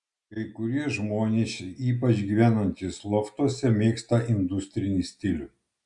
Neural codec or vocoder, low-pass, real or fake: none; 10.8 kHz; real